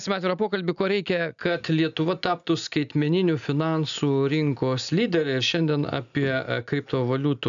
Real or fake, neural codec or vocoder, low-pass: real; none; 7.2 kHz